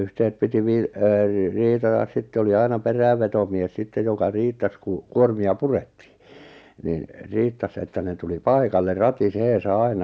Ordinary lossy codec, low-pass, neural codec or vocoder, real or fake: none; none; none; real